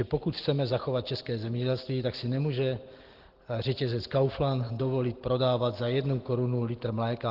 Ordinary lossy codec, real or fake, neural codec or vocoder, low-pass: Opus, 16 kbps; real; none; 5.4 kHz